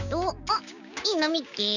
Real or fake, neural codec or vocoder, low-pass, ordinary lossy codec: fake; codec, 16 kHz, 6 kbps, DAC; 7.2 kHz; none